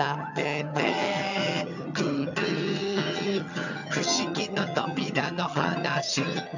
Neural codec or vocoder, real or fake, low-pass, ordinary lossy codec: vocoder, 22.05 kHz, 80 mel bands, HiFi-GAN; fake; 7.2 kHz; none